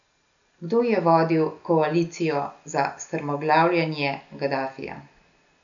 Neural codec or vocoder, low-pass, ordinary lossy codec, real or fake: none; 7.2 kHz; none; real